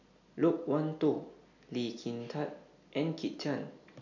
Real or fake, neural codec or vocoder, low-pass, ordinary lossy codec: real; none; 7.2 kHz; none